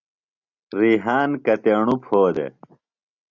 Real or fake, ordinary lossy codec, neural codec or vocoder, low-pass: real; Opus, 64 kbps; none; 7.2 kHz